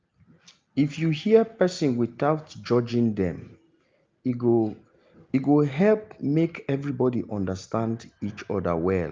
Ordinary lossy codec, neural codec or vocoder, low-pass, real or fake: Opus, 24 kbps; none; 7.2 kHz; real